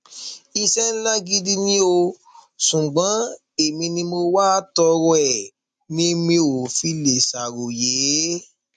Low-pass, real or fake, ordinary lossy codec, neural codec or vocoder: 10.8 kHz; real; MP3, 64 kbps; none